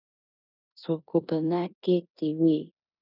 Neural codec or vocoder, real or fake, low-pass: codec, 16 kHz in and 24 kHz out, 0.9 kbps, LongCat-Audio-Codec, four codebook decoder; fake; 5.4 kHz